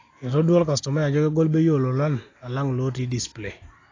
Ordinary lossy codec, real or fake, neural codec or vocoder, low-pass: AAC, 32 kbps; real; none; 7.2 kHz